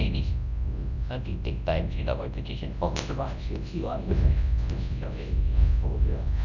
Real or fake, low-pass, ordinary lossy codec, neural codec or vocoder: fake; 7.2 kHz; none; codec, 24 kHz, 0.9 kbps, WavTokenizer, large speech release